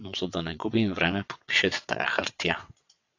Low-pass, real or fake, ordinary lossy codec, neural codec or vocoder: 7.2 kHz; fake; AAC, 48 kbps; vocoder, 22.05 kHz, 80 mel bands, WaveNeXt